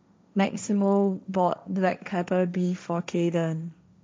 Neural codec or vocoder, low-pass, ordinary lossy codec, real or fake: codec, 16 kHz, 1.1 kbps, Voila-Tokenizer; none; none; fake